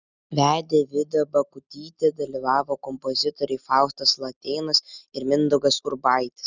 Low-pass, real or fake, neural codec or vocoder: 7.2 kHz; real; none